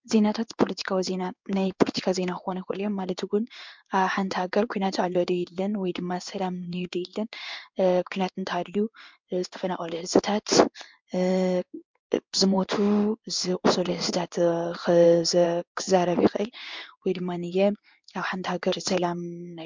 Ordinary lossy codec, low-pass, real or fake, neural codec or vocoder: MP3, 64 kbps; 7.2 kHz; fake; codec, 16 kHz in and 24 kHz out, 1 kbps, XY-Tokenizer